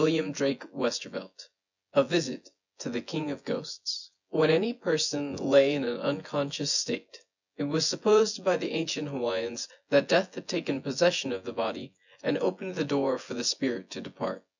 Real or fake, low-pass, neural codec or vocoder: fake; 7.2 kHz; vocoder, 24 kHz, 100 mel bands, Vocos